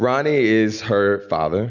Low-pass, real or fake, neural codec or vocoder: 7.2 kHz; real; none